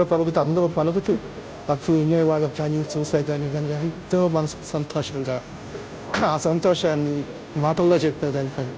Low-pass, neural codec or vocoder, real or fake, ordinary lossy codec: none; codec, 16 kHz, 0.5 kbps, FunCodec, trained on Chinese and English, 25 frames a second; fake; none